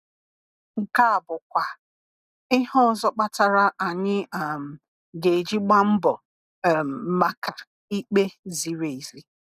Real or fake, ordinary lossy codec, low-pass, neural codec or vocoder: real; none; 14.4 kHz; none